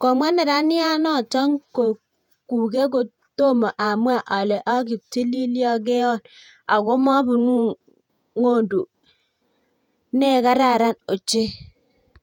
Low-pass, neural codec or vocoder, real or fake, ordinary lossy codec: 19.8 kHz; vocoder, 48 kHz, 128 mel bands, Vocos; fake; none